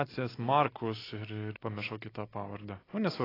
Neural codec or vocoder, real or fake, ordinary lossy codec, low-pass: none; real; AAC, 24 kbps; 5.4 kHz